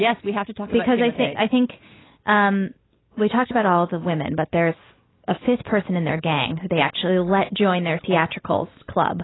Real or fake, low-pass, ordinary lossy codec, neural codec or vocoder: real; 7.2 kHz; AAC, 16 kbps; none